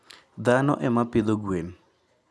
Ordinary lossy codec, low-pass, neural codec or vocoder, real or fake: none; none; none; real